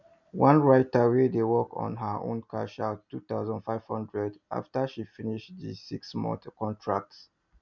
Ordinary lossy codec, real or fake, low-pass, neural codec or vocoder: none; real; 7.2 kHz; none